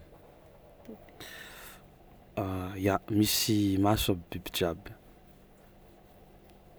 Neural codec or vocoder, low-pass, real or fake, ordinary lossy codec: none; none; real; none